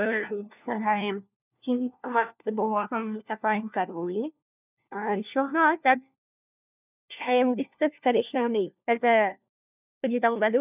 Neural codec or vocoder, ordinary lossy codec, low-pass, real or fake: codec, 16 kHz, 1 kbps, FunCodec, trained on LibriTTS, 50 frames a second; none; 3.6 kHz; fake